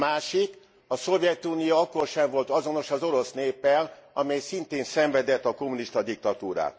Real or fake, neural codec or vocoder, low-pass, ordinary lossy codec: real; none; none; none